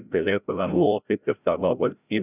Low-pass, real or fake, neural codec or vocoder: 3.6 kHz; fake; codec, 16 kHz, 0.5 kbps, FreqCodec, larger model